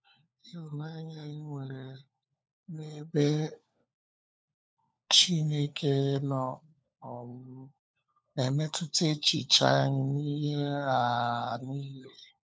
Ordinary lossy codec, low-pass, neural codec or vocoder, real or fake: none; none; codec, 16 kHz, 4 kbps, FunCodec, trained on LibriTTS, 50 frames a second; fake